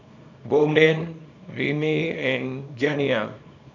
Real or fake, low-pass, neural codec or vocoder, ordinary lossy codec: fake; 7.2 kHz; codec, 24 kHz, 0.9 kbps, WavTokenizer, small release; AAC, 48 kbps